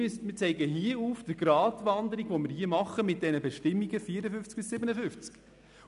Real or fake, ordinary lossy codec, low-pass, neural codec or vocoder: real; none; 10.8 kHz; none